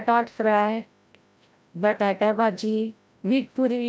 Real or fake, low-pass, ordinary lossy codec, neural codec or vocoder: fake; none; none; codec, 16 kHz, 0.5 kbps, FreqCodec, larger model